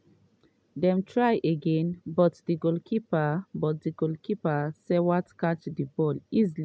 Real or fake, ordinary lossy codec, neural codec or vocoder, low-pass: real; none; none; none